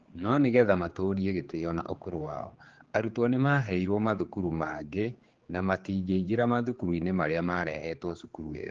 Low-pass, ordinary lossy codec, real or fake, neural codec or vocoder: 7.2 kHz; Opus, 16 kbps; fake; codec, 16 kHz, 4 kbps, X-Codec, HuBERT features, trained on general audio